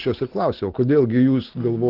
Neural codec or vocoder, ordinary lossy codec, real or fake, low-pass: none; Opus, 16 kbps; real; 5.4 kHz